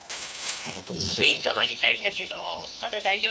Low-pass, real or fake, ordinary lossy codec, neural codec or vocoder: none; fake; none; codec, 16 kHz, 1 kbps, FunCodec, trained on LibriTTS, 50 frames a second